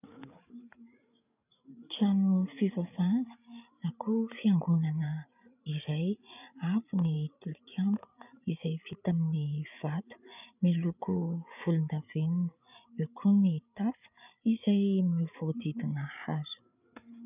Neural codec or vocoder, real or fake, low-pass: codec, 16 kHz, 8 kbps, FreqCodec, larger model; fake; 3.6 kHz